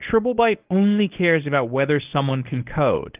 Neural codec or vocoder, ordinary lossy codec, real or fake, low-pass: codec, 24 kHz, 0.9 kbps, WavTokenizer, medium speech release version 1; Opus, 24 kbps; fake; 3.6 kHz